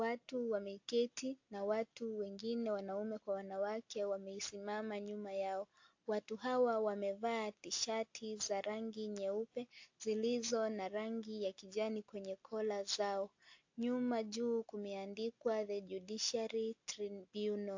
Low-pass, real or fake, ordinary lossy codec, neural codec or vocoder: 7.2 kHz; real; AAC, 48 kbps; none